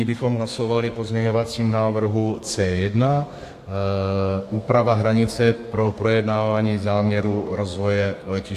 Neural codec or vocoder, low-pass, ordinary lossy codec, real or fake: codec, 32 kHz, 1.9 kbps, SNAC; 14.4 kHz; AAC, 64 kbps; fake